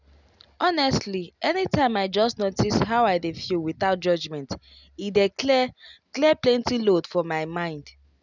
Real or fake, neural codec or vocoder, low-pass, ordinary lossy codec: real; none; 7.2 kHz; none